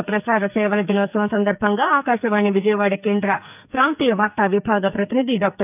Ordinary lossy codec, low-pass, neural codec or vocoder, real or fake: none; 3.6 kHz; codec, 44.1 kHz, 2.6 kbps, SNAC; fake